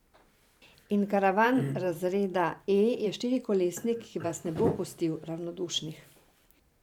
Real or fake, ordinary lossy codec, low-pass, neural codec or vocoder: fake; none; 19.8 kHz; vocoder, 44.1 kHz, 128 mel bands every 512 samples, BigVGAN v2